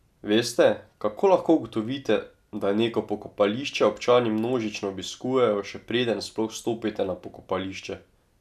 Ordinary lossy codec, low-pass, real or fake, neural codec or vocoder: none; 14.4 kHz; real; none